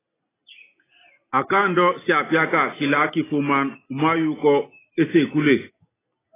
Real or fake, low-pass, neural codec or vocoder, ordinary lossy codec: real; 3.6 kHz; none; AAC, 16 kbps